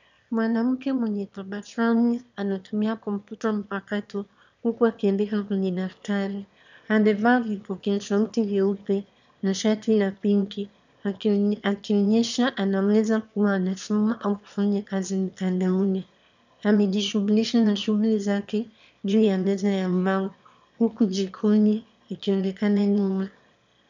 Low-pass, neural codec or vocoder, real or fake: 7.2 kHz; autoencoder, 22.05 kHz, a latent of 192 numbers a frame, VITS, trained on one speaker; fake